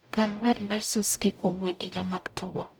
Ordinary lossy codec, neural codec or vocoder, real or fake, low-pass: none; codec, 44.1 kHz, 0.9 kbps, DAC; fake; none